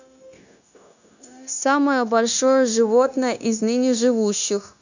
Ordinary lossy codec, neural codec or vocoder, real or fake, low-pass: none; codec, 16 kHz, 0.9 kbps, LongCat-Audio-Codec; fake; 7.2 kHz